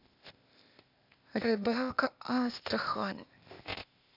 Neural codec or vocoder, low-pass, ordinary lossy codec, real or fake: codec, 16 kHz, 0.8 kbps, ZipCodec; 5.4 kHz; none; fake